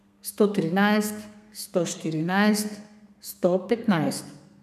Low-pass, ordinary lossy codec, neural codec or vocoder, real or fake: 14.4 kHz; none; codec, 32 kHz, 1.9 kbps, SNAC; fake